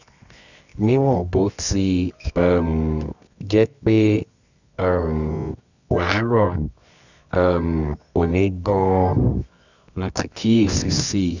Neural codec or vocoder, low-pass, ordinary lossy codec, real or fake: codec, 24 kHz, 0.9 kbps, WavTokenizer, medium music audio release; 7.2 kHz; none; fake